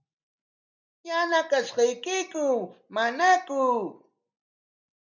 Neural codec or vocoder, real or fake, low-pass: none; real; 7.2 kHz